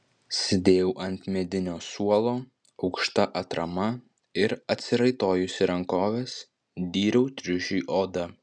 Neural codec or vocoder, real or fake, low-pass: none; real; 9.9 kHz